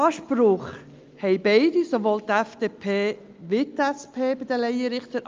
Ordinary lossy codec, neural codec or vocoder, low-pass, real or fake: Opus, 24 kbps; none; 7.2 kHz; real